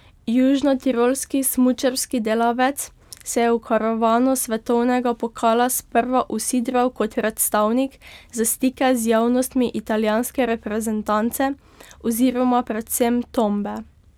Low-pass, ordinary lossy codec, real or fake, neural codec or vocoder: 19.8 kHz; none; real; none